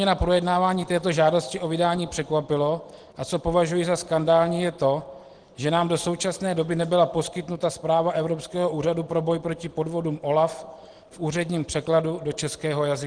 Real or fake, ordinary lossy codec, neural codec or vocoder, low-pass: real; Opus, 16 kbps; none; 9.9 kHz